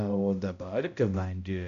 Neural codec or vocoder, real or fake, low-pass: codec, 16 kHz, 0.5 kbps, X-Codec, HuBERT features, trained on balanced general audio; fake; 7.2 kHz